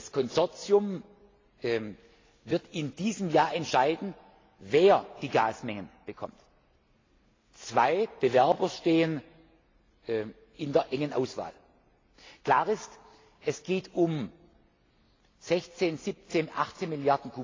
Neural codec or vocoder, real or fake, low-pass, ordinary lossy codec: none; real; 7.2 kHz; AAC, 32 kbps